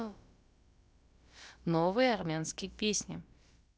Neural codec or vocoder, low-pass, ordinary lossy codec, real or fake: codec, 16 kHz, about 1 kbps, DyCAST, with the encoder's durations; none; none; fake